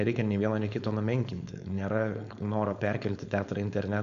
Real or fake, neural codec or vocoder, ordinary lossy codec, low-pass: fake; codec, 16 kHz, 4.8 kbps, FACodec; AAC, 64 kbps; 7.2 kHz